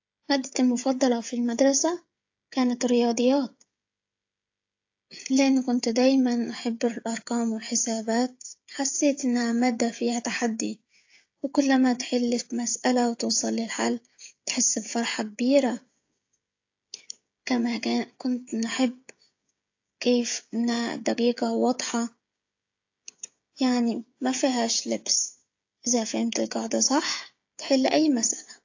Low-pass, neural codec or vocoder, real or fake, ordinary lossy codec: 7.2 kHz; codec, 16 kHz, 16 kbps, FreqCodec, smaller model; fake; AAC, 48 kbps